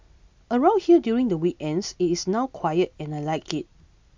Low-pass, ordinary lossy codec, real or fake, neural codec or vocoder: 7.2 kHz; MP3, 64 kbps; real; none